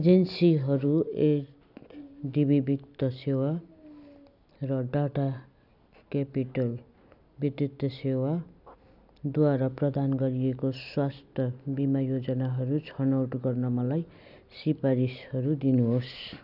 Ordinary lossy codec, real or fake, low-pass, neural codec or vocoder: none; real; 5.4 kHz; none